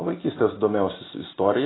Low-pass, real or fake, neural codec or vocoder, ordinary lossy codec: 7.2 kHz; real; none; AAC, 16 kbps